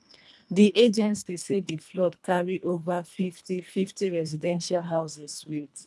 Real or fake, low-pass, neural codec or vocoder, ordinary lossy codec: fake; none; codec, 24 kHz, 1.5 kbps, HILCodec; none